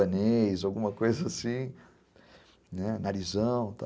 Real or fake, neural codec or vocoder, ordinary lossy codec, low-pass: real; none; none; none